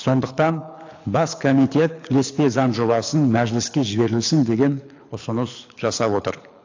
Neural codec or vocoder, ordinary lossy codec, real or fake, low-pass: codec, 24 kHz, 6 kbps, HILCodec; AAC, 48 kbps; fake; 7.2 kHz